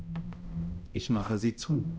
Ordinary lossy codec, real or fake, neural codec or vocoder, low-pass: none; fake; codec, 16 kHz, 0.5 kbps, X-Codec, HuBERT features, trained on balanced general audio; none